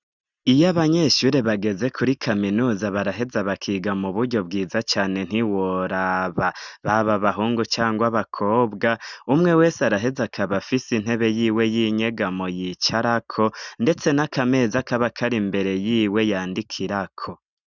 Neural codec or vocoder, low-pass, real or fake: none; 7.2 kHz; real